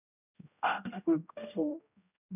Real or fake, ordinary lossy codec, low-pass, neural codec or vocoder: fake; none; 3.6 kHz; codec, 16 kHz, 0.5 kbps, X-Codec, HuBERT features, trained on general audio